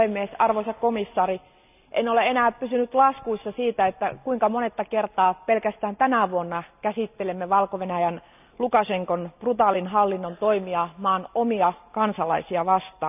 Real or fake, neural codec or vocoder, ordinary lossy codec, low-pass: real; none; none; 3.6 kHz